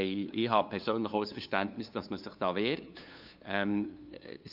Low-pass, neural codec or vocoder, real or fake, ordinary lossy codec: 5.4 kHz; codec, 16 kHz, 2 kbps, FunCodec, trained on LibriTTS, 25 frames a second; fake; none